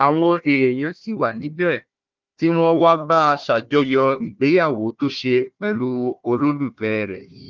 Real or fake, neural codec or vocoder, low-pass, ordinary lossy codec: fake; codec, 16 kHz, 1 kbps, FunCodec, trained on Chinese and English, 50 frames a second; 7.2 kHz; Opus, 32 kbps